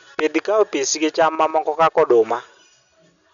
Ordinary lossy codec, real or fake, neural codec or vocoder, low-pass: none; real; none; 7.2 kHz